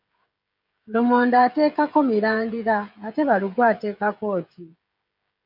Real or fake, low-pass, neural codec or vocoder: fake; 5.4 kHz; codec, 16 kHz, 16 kbps, FreqCodec, smaller model